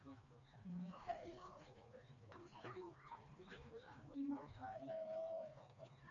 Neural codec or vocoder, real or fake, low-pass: codec, 16 kHz, 2 kbps, FreqCodec, smaller model; fake; 7.2 kHz